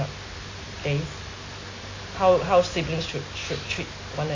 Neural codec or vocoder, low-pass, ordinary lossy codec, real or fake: none; 7.2 kHz; AAC, 32 kbps; real